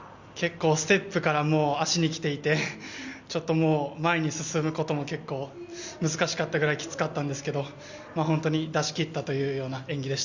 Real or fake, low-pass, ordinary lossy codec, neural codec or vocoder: real; 7.2 kHz; Opus, 64 kbps; none